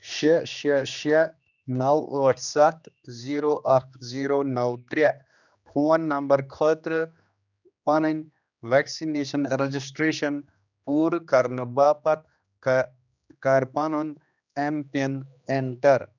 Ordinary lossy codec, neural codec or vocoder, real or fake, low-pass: none; codec, 16 kHz, 2 kbps, X-Codec, HuBERT features, trained on general audio; fake; 7.2 kHz